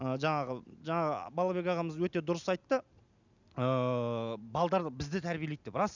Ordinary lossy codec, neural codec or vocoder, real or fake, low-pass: none; none; real; 7.2 kHz